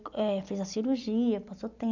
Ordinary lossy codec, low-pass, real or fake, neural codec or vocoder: none; 7.2 kHz; real; none